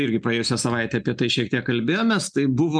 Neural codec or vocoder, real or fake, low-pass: none; real; 9.9 kHz